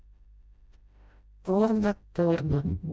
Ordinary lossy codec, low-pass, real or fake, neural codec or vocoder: none; none; fake; codec, 16 kHz, 0.5 kbps, FreqCodec, smaller model